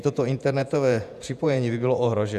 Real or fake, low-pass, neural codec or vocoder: fake; 14.4 kHz; vocoder, 48 kHz, 128 mel bands, Vocos